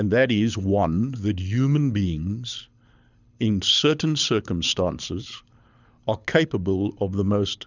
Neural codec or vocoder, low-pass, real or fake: codec, 24 kHz, 6 kbps, HILCodec; 7.2 kHz; fake